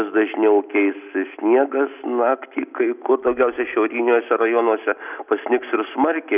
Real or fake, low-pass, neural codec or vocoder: real; 3.6 kHz; none